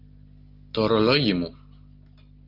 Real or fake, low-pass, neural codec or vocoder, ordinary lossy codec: real; 5.4 kHz; none; Opus, 24 kbps